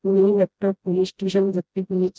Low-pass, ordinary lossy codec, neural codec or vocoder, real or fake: none; none; codec, 16 kHz, 0.5 kbps, FreqCodec, smaller model; fake